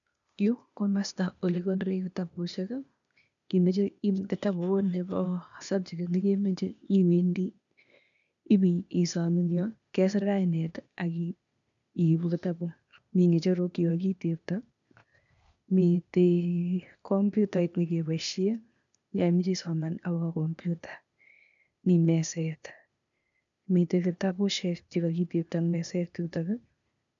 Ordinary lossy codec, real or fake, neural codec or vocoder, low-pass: none; fake; codec, 16 kHz, 0.8 kbps, ZipCodec; 7.2 kHz